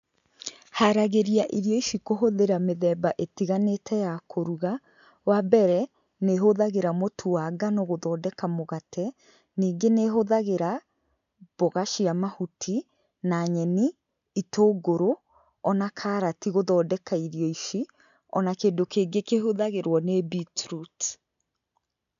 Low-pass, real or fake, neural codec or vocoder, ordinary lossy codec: 7.2 kHz; real; none; none